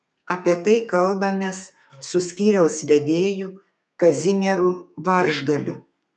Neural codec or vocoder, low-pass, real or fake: codec, 32 kHz, 1.9 kbps, SNAC; 10.8 kHz; fake